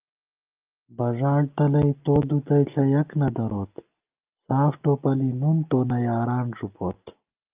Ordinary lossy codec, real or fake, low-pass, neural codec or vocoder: Opus, 24 kbps; real; 3.6 kHz; none